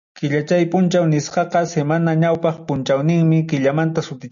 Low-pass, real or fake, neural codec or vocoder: 7.2 kHz; real; none